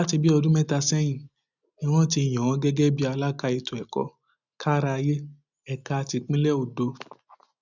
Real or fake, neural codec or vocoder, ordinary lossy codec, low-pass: real; none; none; 7.2 kHz